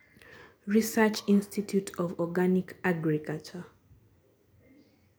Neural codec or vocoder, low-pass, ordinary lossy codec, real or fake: none; none; none; real